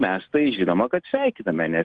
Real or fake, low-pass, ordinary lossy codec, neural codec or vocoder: real; 9.9 kHz; Opus, 24 kbps; none